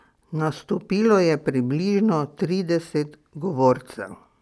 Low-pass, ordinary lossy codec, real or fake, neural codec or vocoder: none; none; real; none